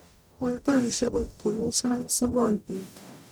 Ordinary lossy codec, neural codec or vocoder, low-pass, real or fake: none; codec, 44.1 kHz, 0.9 kbps, DAC; none; fake